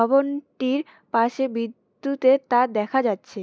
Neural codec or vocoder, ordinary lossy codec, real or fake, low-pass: none; none; real; none